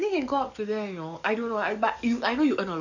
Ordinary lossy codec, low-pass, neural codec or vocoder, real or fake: none; 7.2 kHz; codec, 44.1 kHz, 7.8 kbps, DAC; fake